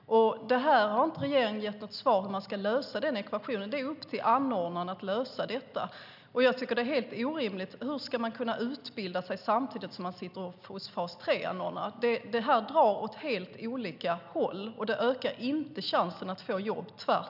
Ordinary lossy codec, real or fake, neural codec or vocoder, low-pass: none; real; none; 5.4 kHz